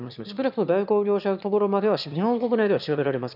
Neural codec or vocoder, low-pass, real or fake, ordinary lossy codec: autoencoder, 22.05 kHz, a latent of 192 numbers a frame, VITS, trained on one speaker; 5.4 kHz; fake; none